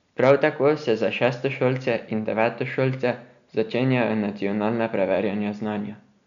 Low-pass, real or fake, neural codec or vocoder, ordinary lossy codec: 7.2 kHz; real; none; none